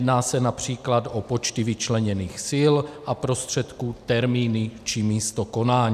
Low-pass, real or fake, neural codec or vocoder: 14.4 kHz; real; none